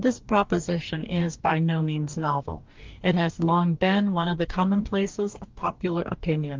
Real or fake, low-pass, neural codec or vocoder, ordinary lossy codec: fake; 7.2 kHz; codec, 44.1 kHz, 2.6 kbps, DAC; Opus, 32 kbps